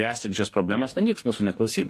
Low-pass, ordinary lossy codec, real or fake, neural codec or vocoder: 14.4 kHz; AAC, 64 kbps; fake; codec, 44.1 kHz, 2.6 kbps, DAC